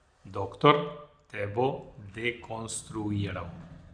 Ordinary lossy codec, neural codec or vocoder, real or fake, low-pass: Opus, 64 kbps; none; real; 9.9 kHz